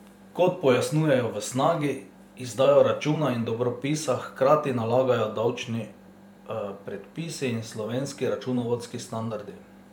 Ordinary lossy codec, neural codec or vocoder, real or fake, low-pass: MP3, 96 kbps; vocoder, 44.1 kHz, 128 mel bands every 512 samples, BigVGAN v2; fake; 19.8 kHz